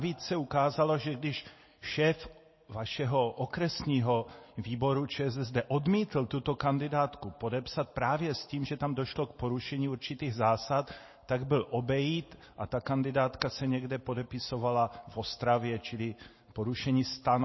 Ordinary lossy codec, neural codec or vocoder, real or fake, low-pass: MP3, 24 kbps; none; real; 7.2 kHz